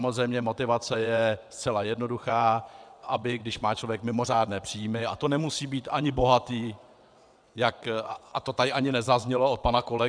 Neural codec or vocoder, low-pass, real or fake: vocoder, 22.05 kHz, 80 mel bands, WaveNeXt; 9.9 kHz; fake